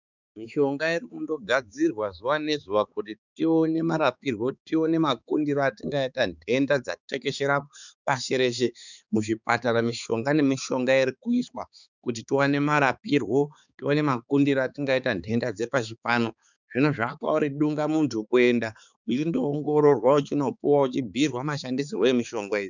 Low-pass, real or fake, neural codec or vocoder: 7.2 kHz; fake; codec, 16 kHz, 4 kbps, X-Codec, HuBERT features, trained on balanced general audio